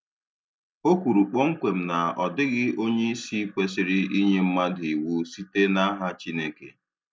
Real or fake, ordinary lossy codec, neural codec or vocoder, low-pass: real; none; none; none